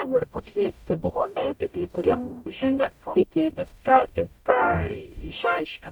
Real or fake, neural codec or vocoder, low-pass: fake; codec, 44.1 kHz, 0.9 kbps, DAC; 19.8 kHz